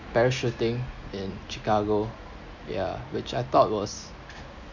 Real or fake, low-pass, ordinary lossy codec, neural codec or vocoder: real; 7.2 kHz; none; none